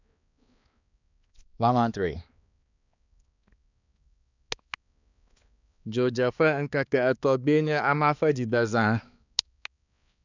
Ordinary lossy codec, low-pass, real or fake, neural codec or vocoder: none; 7.2 kHz; fake; codec, 16 kHz, 2 kbps, X-Codec, HuBERT features, trained on balanced general audio